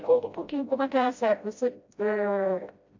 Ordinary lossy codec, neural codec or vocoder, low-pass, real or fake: MP3, 48 kbps; codec, 16 kHz, 0.5 kbps, FreqCodec, smaller model; 7.2 kHz; fake